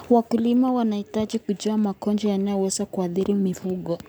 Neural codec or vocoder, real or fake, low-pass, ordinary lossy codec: none; real; none; none